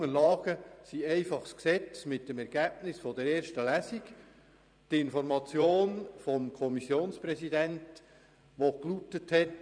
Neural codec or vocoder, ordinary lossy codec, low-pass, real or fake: vocoder, 44.1 kHz, 128 mel bands every 512 samples, BigVGAN v2; none; 9.9 kHz; fake